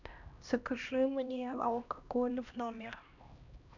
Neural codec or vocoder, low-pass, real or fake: codec, 16 kHz, 1 kbps, X-Codec, HuBERT features, trained on LibriSpeech; 7.2 kHz; fake